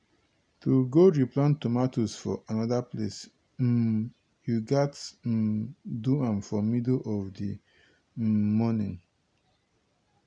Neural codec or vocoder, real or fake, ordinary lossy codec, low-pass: none; real; none; 9.9 kHz